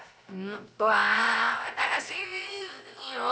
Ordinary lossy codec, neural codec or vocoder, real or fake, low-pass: none; codec, 16 kHz, 0.3 kbps, FocalCodec; fake; none